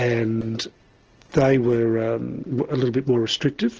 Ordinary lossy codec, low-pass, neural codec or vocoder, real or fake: Opus, 16 kbps; 7.2 kHz; none; real